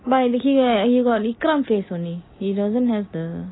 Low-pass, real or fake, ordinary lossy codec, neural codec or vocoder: 7.2 kHz; real; AAC, 16 kbps; none